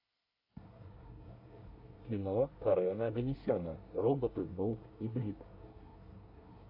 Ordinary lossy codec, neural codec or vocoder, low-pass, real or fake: AAC, 48 kbps; codec, 24 kHz, 1 kbps, SNAC; 5.4 kHz; fake